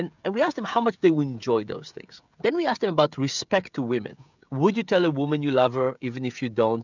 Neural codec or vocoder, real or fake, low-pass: codec, 16 kHz, 16 kbps, FreqCodec, smaller model; fake; 7.2 kHz